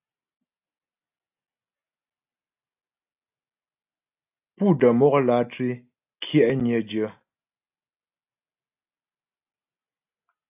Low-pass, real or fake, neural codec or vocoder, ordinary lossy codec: 3.6 kHz; real; none; AAC, 32 kbps